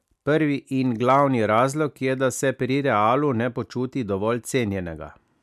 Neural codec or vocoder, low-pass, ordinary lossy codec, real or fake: none; 14.4 kHz; MP3, 96 kbps; real